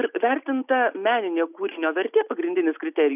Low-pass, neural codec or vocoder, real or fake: 3.6 kHz; none; real